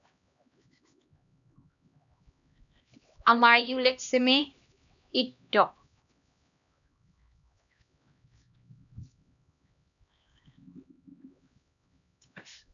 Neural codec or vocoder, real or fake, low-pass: codec, 16 kHz, 1 kbps, X-Codec, HuBERT features, trained on LibriSpeech; fake; 7.2 kHz